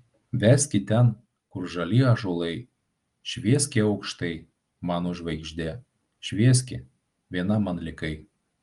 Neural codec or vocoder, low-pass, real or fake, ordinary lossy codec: none; 10.8 kHz; real; Opus, 32 kbps